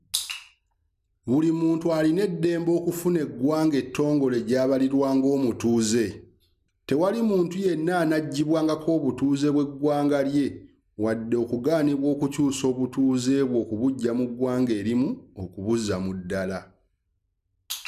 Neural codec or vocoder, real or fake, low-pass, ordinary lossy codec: none; real; 14.4 kHz; none